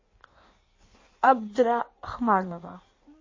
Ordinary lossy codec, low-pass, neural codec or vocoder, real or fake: MP3, 32 kbps; 7.2 kHz; codec, 16 kHz in and 24 kHz out, 1.1 kbps, FireRedTTS-2 codec; fake